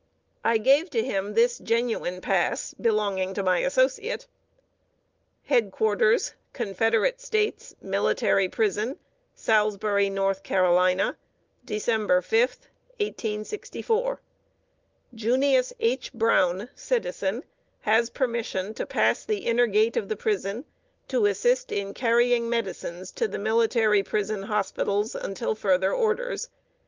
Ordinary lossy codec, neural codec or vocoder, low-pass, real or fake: Opus, 32 kbps; none; 7.2 kHz; real